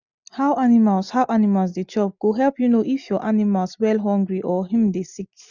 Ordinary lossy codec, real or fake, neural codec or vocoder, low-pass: none; real; none; 7.2 kHz